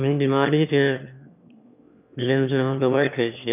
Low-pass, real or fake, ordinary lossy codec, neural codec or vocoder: 3.6 kHz; fake; none; autoencoder, 22.05 kHz, a latent of 192 numbers a frame, VITS, trained on one speaker